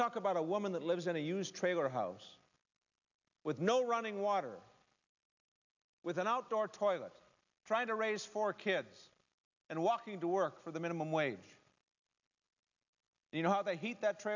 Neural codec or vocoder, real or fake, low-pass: none; real; 7.2 kHz